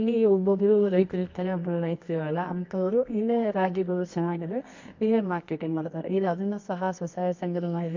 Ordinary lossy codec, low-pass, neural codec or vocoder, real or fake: MP3, 48 kbps; 7.2 kHz; codec, 24 kHz, 0.9 kbps, WavTokenizer, medium music audio release; fake